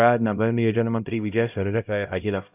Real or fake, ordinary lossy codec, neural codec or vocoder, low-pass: fake; none; codec, 16 kHz, 0.5 kbps, X-Codec, HuBERT features, trained on LibriSpeech; 3.6 kHz